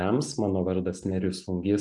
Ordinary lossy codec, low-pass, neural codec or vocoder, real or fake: MP3, 96 kbps; 10.8 kHz; none; real